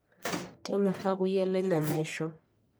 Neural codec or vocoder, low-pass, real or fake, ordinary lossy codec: codec, 44.1 kHz, 1.7 kbps, Pupu-Codec; none; fake; none